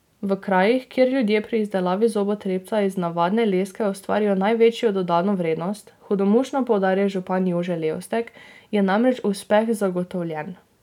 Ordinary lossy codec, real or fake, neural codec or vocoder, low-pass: none; real; none; 19.8 kHz